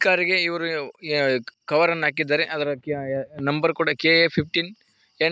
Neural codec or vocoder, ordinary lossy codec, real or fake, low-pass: none; none; real; none